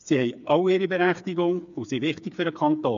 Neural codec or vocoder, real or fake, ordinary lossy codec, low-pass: codec, 16 kHz, 4 kbps, FreqCodec, smaller model; fake; MP3, 64 kbps; 7.2 kHz